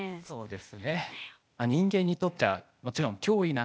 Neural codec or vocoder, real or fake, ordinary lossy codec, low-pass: codec, 16 kHz, 0.8 kbps, ZipCodec; fake; none; none